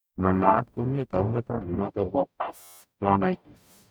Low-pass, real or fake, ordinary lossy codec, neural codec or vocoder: none; fake; none; codec, 44.1 kHz, 0.9 kbps, DAC